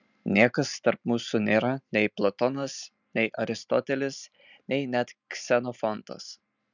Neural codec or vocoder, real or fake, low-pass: none; real; 7.2 kHz